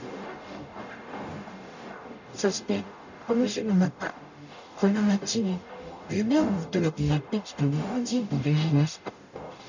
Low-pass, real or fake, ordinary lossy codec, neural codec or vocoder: 7.2 kHz; fake; none; codec, 44.1 kHz, 0.9 kbps, DAC